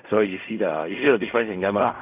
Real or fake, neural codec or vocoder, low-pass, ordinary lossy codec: fake; codec, 16 kHz in and 24 kHz out, 0.4 kbps, LongCat-Audio-Codec, fine tuned four codebook decoder; 3.6 kHz; none